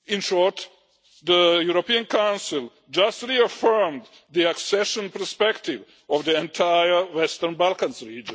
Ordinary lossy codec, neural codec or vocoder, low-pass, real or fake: none; none; none; real